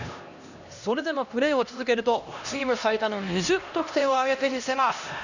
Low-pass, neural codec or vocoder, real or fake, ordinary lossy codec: 7.2 kHz; codec, 16 kHz, 1 kbps, X-Codec, HuBERT features, trained on LibriSpeech; fake; none